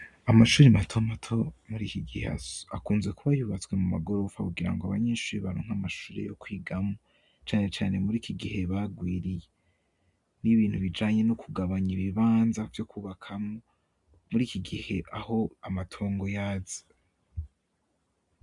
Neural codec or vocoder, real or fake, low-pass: none; real; 10.8 kHz